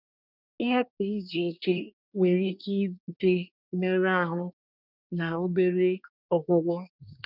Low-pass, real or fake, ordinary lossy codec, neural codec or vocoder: 5.4 kHz; fake; none; codec, 24 kHz, 1 kbps, SNAC